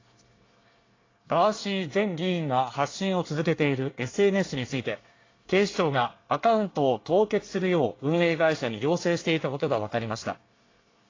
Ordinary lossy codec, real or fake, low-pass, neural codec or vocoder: AAC, 32 kbps; fake; 7.2 kHz; codec, 24 kHz, 1 kbps, SNAC